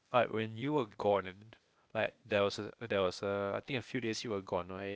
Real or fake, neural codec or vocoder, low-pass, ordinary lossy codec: fake; codec, 16 kHz, 0.8 kbps, ZipCodec; none; none